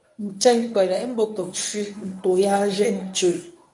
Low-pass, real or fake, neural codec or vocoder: 10.8 kHz; fake; codec, 24 kHz, 0.9 kbps, WavTokenizer, medium speech release version 1